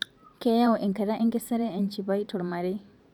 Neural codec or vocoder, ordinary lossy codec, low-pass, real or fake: vocoder, 44.1 kHz, 128 mel bands every 512 samples, BigVGAN v2; none; 19.8 kHz; fake